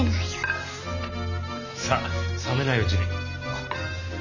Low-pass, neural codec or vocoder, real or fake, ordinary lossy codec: 7.2 kHz; none; real; none